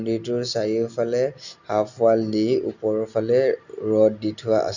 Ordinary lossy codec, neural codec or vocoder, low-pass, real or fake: none; none; 7.2 kHz; real